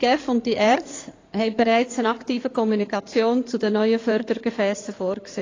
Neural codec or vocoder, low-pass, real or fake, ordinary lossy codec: codec, 16 kHz in and 24 kHz out, 2.2 kbps, FireRedTTS-2 codec; 7.2 kHz; fake; AAC, 32 kbps